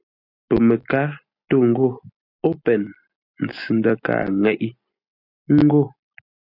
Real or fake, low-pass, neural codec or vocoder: real; 5.4 kHz; none